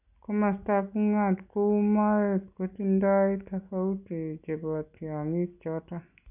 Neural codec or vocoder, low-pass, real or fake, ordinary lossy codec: none; 3.6 kHz; real; none